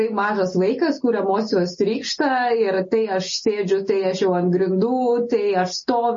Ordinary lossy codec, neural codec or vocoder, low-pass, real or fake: MP3, 32 kbps; none; 7.2 kHz; real